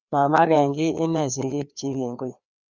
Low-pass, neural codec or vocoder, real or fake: 7.2 kHz; codec, 16 kHz, 2 kbps, FreqCodec, larger model; fake